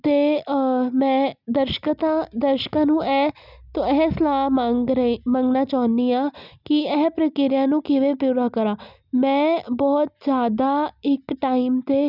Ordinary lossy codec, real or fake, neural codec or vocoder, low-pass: none; real; none; 5.4 kHz